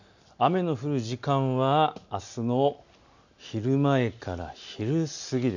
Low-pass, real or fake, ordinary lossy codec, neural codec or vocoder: 7.2 kHz; real; none; none